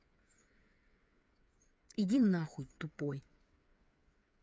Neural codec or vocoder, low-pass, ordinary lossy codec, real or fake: codec, 16 kHz, 8 kbps, FreqCodec, smaller model; none; none; fake